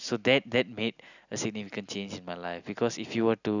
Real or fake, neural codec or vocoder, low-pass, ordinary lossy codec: real; none; 7.2 kHz; none